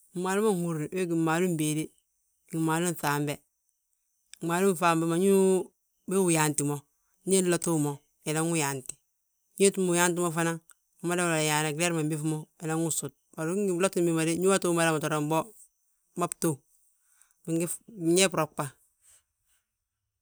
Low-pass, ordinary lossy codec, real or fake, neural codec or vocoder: none; none; real; none